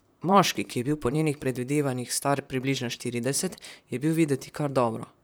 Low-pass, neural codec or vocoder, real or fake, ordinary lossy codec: none; vocoder, 44.1 kHz, 128 mel bands, Pupu-Vocoder; fake; none